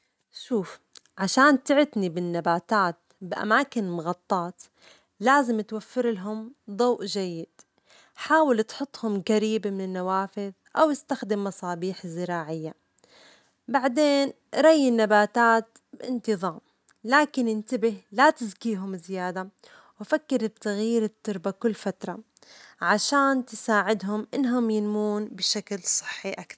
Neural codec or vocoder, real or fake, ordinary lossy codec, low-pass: none; real; none; none